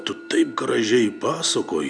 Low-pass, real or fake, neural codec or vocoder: 9.9 kHz; real; none